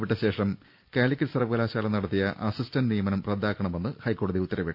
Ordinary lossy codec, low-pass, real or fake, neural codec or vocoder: none; 5.4 kHz; real; none